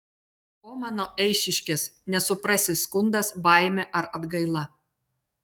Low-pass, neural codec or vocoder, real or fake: 19.8 kHz; codec, 44.1 kHz, 7.8 kbps, DAC; fake